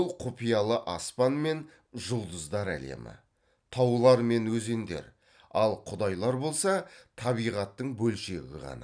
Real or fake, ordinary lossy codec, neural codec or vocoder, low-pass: real; none; none; 9.9 kHz